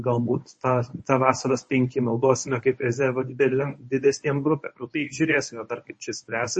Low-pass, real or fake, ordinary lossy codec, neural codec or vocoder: 10.8 kHz; fake; MP3, 32 kbps; codec, 24 kHz, 0.9 kbps, WavTokenizer, medium speech release version 1